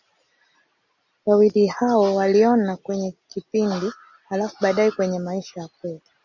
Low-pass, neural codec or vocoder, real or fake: 7.2 kHz; none; real